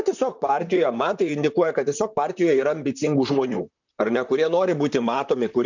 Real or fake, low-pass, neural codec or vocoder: fake; 7.2 kHz; vocoder, 44.1 kHz, 128 mel bands, Pupu-Vocoder